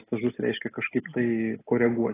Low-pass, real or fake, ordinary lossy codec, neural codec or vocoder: 3.6 kHz; real; AAC, 16 kbps; none